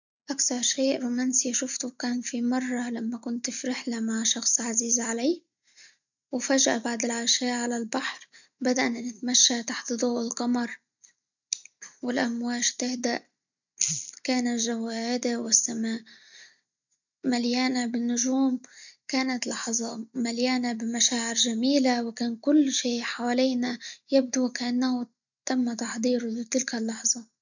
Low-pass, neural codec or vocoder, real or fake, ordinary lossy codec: 7.2 kHz; none; real; none